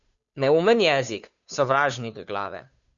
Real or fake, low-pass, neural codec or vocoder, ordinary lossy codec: fake; 7.2 kHz; codec, 16 kHz, 2 kbps, FunCodec, trained on Chinese and English, 25 frames a second; MP3, 96 kbps